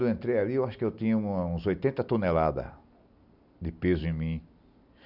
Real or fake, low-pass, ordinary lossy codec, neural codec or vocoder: real; 5.4 kHz; none; none